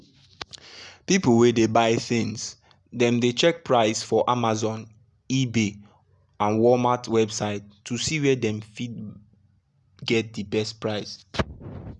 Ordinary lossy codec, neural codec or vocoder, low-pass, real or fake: none; none; 10.8 kHz; real